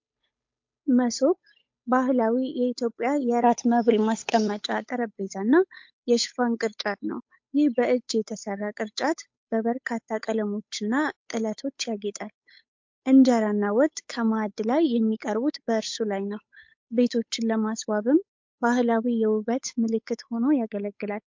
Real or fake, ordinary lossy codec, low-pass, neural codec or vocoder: fake; MP3, 48 kbps; 7.2 kHz; codec, 16 kHz, 8 kbps, FunCodec, trained on Chinese and English, 25 frames a second